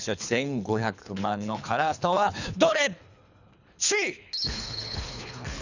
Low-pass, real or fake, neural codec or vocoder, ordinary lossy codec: 7.2 kHz; fake; codec, 24 kHz, 3 kbps, HILCodec; none